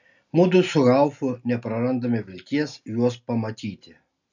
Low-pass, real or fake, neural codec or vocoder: 7.2 kHz; real; none